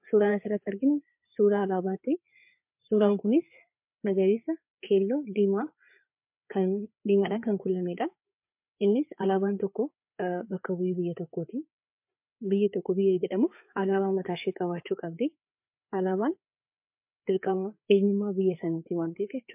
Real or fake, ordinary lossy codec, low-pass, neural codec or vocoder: fake; MP3, 32 kbps; 3.6 kHz; codec, 16 kHz, 4 kbps, FreqCodec, larger model